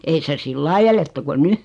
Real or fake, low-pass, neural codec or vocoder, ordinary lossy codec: real; 10.8 kHz; none; MP3, 64 kbps